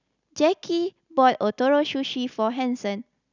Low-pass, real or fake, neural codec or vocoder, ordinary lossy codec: 7.2 kHz; real; none; none